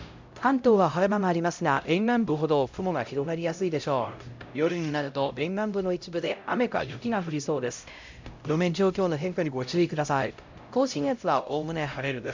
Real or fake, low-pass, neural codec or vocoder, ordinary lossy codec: fake; 7.2 kHz; codec, 16 kHz, 0.5 kbps, X-Codec, HuBERT features, trained on LibriSpeech; MP3, 64 kbps